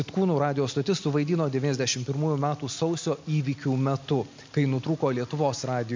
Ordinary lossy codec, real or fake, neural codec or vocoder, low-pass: MP3, 64 kbps; real; none; 7.2 kHz